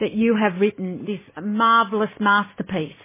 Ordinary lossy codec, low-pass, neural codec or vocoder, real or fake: MP3, 16 kbps; 3.6 kHz; none; real